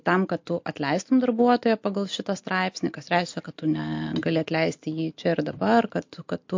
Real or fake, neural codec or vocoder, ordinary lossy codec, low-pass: real; none; MP3, 48 kbps; 7.2 kHz